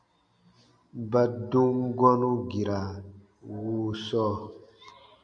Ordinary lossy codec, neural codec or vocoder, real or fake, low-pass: MP3, 96 kbps; none; real; 9.9 kHz